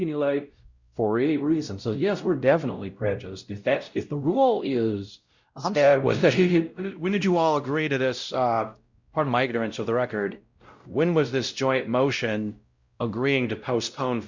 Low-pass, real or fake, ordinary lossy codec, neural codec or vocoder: 7.2 kHz; fake; Opus, 64 kbps; codec, 16 kHz, 0.5 kbps, X-Codec, WavLM features, trained on Multilingual LibriSpeech